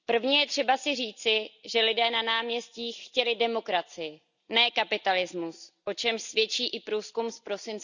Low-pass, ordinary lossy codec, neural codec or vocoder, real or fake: 7.2 kHz; none; none; real